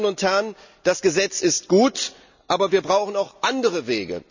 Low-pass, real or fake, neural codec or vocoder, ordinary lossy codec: 7.2 kHz; real; none; none